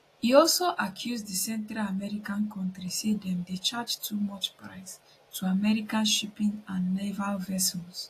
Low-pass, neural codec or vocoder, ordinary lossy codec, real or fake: 14.4 kHz; none; AAC, 48 kbps; real